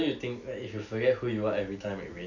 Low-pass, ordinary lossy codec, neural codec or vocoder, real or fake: 7.2 kHz; none; none; real